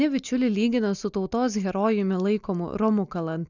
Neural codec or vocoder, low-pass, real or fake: none; 7.2 kHz; real